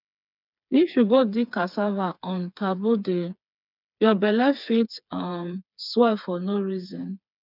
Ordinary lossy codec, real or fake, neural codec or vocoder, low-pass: none; fake; codec, 16 kHz, 4 kbps, FreqCodec, smaller model; 5.4 kHz